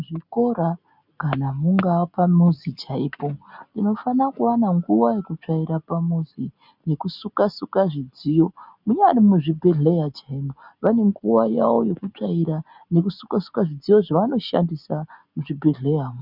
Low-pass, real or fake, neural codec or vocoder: 5.4 kHz; real; none